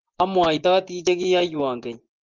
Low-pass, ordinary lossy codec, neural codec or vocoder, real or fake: 7.2 kHz; Opus, 32 kbps; vocoder, 24 kHz, 100 mel bands, Vocos; fake